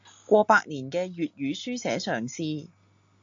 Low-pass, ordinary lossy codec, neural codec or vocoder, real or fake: 7.2 kHz; AAC, 48 kbps; none; real